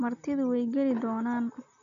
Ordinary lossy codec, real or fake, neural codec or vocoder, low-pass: MP3, 96 kbps; real; none; 7.2 kHz